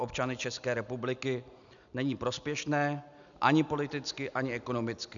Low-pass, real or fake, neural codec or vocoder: 7.2 kHz; real; none